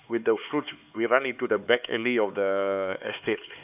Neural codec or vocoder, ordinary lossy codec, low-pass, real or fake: codec, 16 kHz, 4 kbps, X-Codec, HuBERT features, trained on LibriSpeech; none; 3.6 kHz; fake